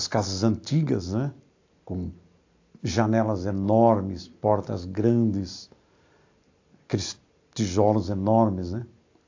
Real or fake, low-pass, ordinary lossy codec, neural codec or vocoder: real; 7.2 kHz; AAC, 48 kbps; none